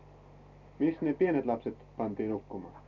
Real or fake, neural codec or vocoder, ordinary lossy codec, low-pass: real; none; none; 7.2 kHz